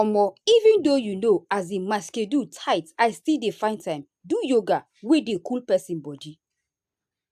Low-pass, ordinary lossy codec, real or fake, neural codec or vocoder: 14.4 kHz; AAC, 96 kbps; real; none